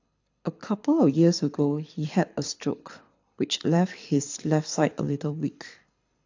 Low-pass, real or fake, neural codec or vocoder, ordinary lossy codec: 7.2 kHz; fake; codec, 24 kHz, 6 kbps, HILCodec; AAC, 48 kbps